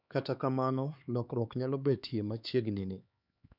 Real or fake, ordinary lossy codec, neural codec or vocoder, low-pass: fake; none; codec, 16 kHz, 4 kbps, X-Codec, HuBERT features, trained on LibriSpeech; 5.4 kHz